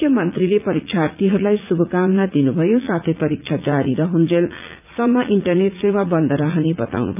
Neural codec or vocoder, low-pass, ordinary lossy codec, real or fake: vocoder, 44.1 kHz, 80 mel bands, Vocos; 3.6 kHz; none; fake